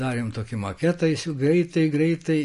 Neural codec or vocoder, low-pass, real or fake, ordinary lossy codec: none; 14.4 kHz; real; MP3, 48 kbps